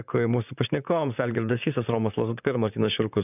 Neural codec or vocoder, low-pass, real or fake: vocoder, 44.1 kHz, 128 mel bands, Pupu-Vocoder; 3.6 kHz; fake